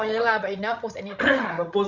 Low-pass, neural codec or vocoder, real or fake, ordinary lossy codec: 7.2 kHz; codec, 16 kHz, 16 kbps, FreqCodec, larger model; fake; Opus, 64 kbps